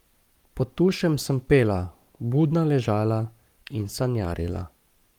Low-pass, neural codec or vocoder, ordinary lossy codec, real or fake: 19.8 kHz; codec, 44.1 kHz, 7.8 kbps, Pupu-Codec; Opus, 32 kbps; fake